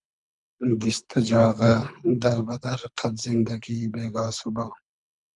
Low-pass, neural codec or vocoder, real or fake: 10.8 kHz; codec, 24 kHz, 3 kbps, HILCodec; fake